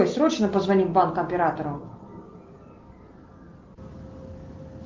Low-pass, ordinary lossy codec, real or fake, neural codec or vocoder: 7.2 kHz; Opus, 32 kbps; real; none